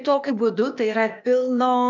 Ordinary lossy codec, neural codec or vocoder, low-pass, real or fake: MP3, 64 kbps; codec, 16 kHz, 0.8 kbps, ZipCodec; 7.2 kHz; fake